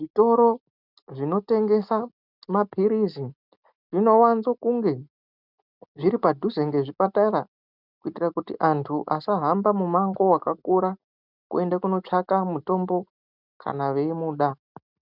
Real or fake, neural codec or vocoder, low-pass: real; none; 5.4 kHz